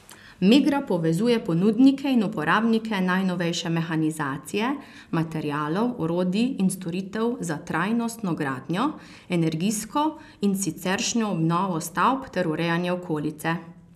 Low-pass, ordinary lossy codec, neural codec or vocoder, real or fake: 14.4 kHz; none; none; real